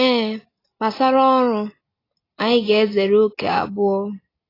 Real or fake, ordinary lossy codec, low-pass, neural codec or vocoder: real; AAC, 24 kbps; 5.4 kHz; none